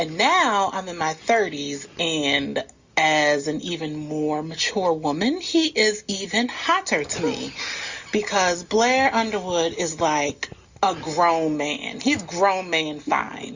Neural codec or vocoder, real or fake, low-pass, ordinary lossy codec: none; real; 7.2 kHz; Opus, 64 kbps